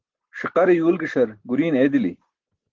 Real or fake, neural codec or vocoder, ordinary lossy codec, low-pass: real; none; Opus, 16 kbps; 7.2 kHz